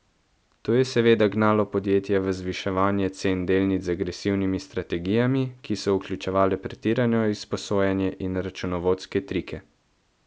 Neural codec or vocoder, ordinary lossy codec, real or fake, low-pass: none; none; real; none